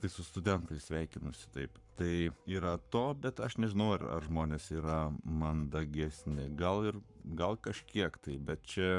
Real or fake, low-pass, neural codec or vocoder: fake; 10.8 kHz; codec, 44.1 kHz, 7.8 kbps, Pupu-Codec